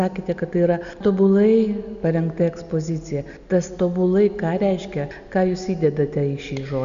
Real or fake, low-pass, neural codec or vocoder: real; 7.2 kHz; none